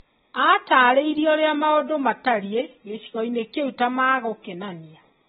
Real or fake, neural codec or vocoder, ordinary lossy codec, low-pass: real; none; AAC, 16 kbps; 19.8 kHz